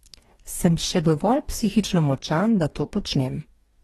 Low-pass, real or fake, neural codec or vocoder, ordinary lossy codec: 19.8 kHz; fake; codec, 44.1 kHz, 2.6 kbps, DAC; AAC, 32 kbps